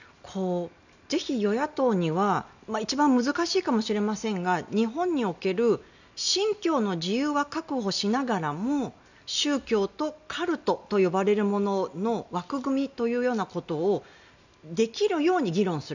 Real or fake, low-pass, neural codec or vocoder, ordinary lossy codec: real; 7.2 kHz; none; none